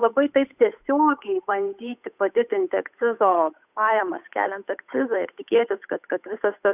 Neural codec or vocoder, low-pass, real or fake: codec, 16 kHz, 8 kbps, FunCodec, trained on Chinese and English, 25 frames a second; 3.6 kHz; fake